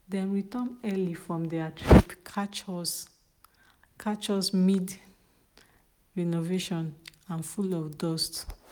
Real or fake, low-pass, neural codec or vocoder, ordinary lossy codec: real; none; none; none